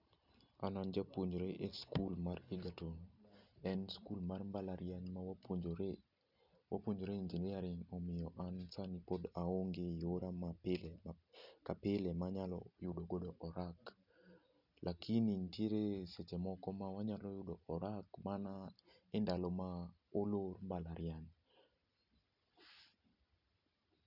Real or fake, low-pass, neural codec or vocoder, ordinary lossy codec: real; 5.4 kHz; none; none